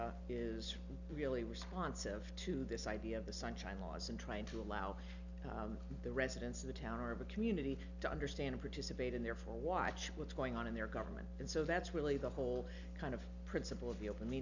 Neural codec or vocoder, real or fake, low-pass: none; real; 7.2 kHz